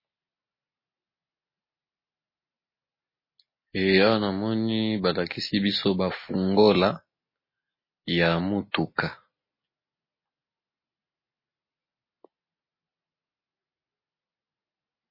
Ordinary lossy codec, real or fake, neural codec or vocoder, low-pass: MP3, 24 kbps; real; none; 5.4 kHz